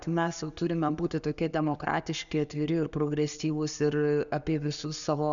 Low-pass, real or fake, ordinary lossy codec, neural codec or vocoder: 7.2 kHz; real; MP3, 96 kbps; none